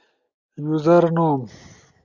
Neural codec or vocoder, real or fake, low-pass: none; real; 7.2 kHz